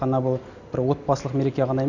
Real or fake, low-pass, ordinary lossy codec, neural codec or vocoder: real; 7.2 kHz; none; none